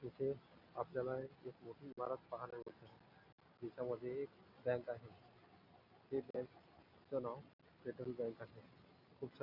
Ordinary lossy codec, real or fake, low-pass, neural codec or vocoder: none; real; 5.4 kHz; none